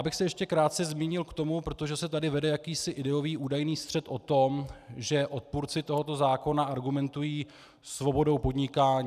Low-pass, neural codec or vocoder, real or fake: 14.4 kHz; none; real